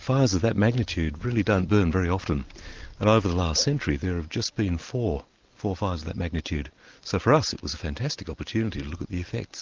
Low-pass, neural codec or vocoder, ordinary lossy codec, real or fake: 7.2 kHz; none; Opus, 32 kbps; real